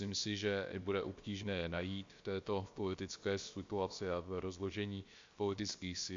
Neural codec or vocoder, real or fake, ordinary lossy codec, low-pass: codec, 16 kHz, about 1 kbps, DyCAST, with the encoder's durations; fake; MP3, 48 kbps; 7.2 kHz